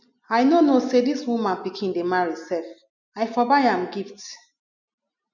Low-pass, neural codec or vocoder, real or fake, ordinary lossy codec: 7.2 kHz; none; real; none